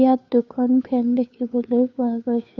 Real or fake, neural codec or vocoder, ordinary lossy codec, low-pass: fake; codec, 16 kHz, 2 kbps, FunCodec, trained on Chinese and English, 25 frames a second; none; 7.2 kHz